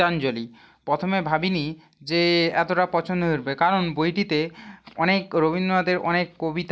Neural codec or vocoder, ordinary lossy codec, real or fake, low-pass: none; none; real; none